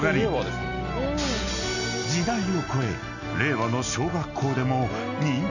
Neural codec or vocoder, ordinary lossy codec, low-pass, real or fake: none; none; 7.2 kHz; real